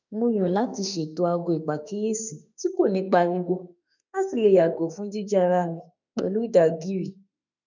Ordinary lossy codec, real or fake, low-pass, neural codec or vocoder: none; fake; 7.2 kHz; autoencoder, 48 kHz, 32 numbers a frame, DAC-VAE, trained on Japanese speech